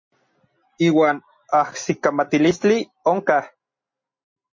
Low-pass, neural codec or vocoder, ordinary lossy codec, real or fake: 7.2 kHz; none; MP3, 32 kbps; real